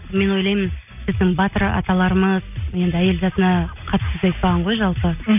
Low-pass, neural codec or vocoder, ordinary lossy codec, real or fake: 3.6 kHz; none; none; real